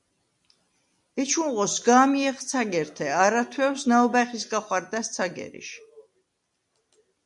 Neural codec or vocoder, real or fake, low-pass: none; real; 10.8 kHz